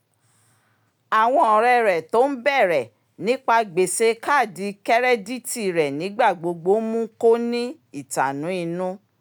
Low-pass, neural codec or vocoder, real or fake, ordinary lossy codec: none; none; real; none